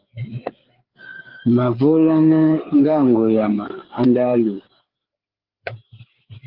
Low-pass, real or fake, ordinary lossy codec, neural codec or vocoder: 5.4 kHz; fake; Opus, 16 kbps; codec, 16 kHz, 4 kbps, FreqCodec, larger model